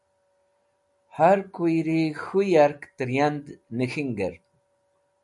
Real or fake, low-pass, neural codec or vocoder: real; 10.8 kHz; none